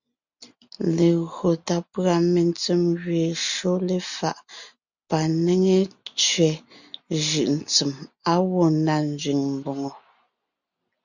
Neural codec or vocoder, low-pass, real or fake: none; 7.2 kHz; real